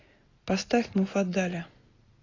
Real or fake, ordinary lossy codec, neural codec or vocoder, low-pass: real; AAC, 32 kbps; none; 7.2 kHz